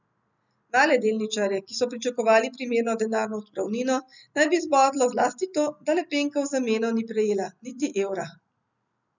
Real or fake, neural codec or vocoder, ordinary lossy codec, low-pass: real; none; none; 7.2 kHz